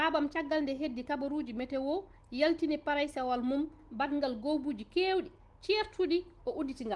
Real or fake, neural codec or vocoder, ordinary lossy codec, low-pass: real; none; Opus, 24 kbps; 10.8 kHz